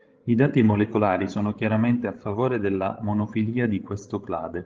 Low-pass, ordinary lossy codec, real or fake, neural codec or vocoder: 7.2 kHz; Opus, 16 kbps; fake; codec, 16 kHz, 16 kbps, FreqCodec, larger model